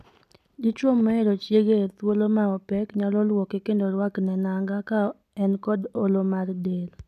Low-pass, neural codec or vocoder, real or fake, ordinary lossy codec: 14.4 kHz; none; real; none